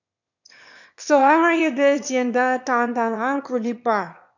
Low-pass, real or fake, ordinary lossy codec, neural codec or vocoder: 7.2 kHz; fake; none; autoencoder, 22.05 kHz, a latent of 192 numbers a frame, VITS, trained on one speaker